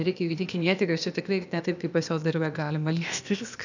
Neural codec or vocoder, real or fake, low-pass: codec, 16 kHz, 0.8 kbps, ZipCodec; fake; 7.2 kHz